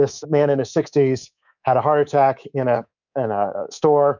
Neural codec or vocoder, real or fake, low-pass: codec, 24 kHz, 3.1 kbps, DualCodec; fake; 7.2 kHz